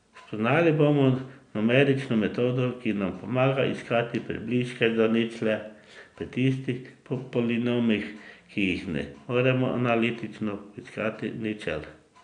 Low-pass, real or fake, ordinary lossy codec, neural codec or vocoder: 9.9 kHz; real; none; none